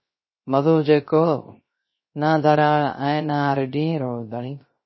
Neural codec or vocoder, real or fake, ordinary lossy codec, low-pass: codec, 16 kHz, 0.3 kbps, FocalCodec; fake; MP3, 24 kbps; 7.2 kHz